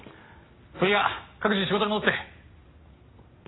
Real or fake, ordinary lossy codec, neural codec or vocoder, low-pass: real; AAC, 16 kbps; none; 7.2 kHz